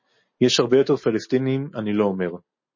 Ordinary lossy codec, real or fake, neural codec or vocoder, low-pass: MP3, 32 kbps; real; none; 7.2 kHz